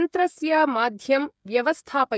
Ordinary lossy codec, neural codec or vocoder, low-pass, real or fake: none; codec, 16 kHz, 8 kbps, FreqCodec, smaller model; none; fake